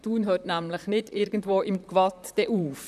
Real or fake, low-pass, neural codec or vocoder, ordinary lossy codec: fake; 14.4 kHz; vocoder, 44.1 kHz, 128 mel bands, Pupu-Vocoder; none